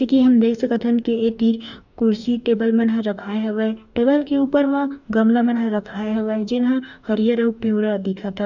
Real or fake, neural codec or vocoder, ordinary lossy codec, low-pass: fake; codec, 44.1 kHz, 2.6 kbps, DAC; none; 7.2 kHz